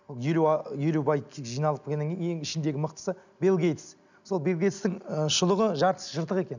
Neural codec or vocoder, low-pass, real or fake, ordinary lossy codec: none; 7.2 kHz; real; none